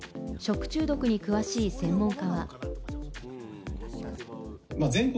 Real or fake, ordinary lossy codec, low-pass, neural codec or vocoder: real; none; none; none